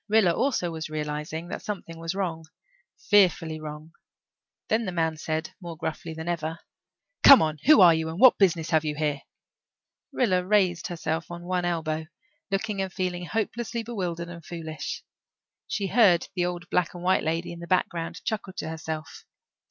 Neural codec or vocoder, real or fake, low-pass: none; real; 7.2 kHz